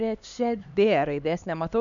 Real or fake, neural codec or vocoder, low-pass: fake; codec, 16 kHz, 2 kbps, X-Codec, HuBERT features, trained on LibriSpeech; 7.2 kHz